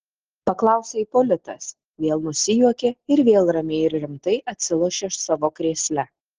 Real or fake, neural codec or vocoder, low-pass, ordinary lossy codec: real; none; 7.2 kHz; Opus, 16 kbps